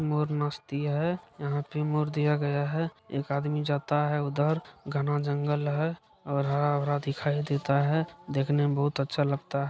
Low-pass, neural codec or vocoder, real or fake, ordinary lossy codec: none; none; real; none